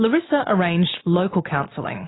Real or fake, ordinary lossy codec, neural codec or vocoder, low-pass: real; AAC, 16 kbps; none; 7.2 kHz